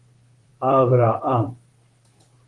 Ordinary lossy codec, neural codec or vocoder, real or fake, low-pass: Opus, 24 kbps; vocoder, 44.1 kHz, 128 mel bands, Pupu-Vocoder; fake; 10.8 kHz